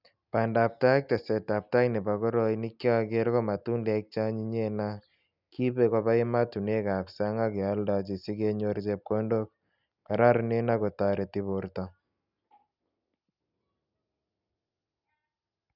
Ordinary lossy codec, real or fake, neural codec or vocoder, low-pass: none; real; none; 5.4 kHz